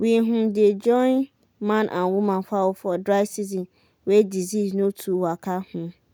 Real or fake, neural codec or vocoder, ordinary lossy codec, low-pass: real; none; none; none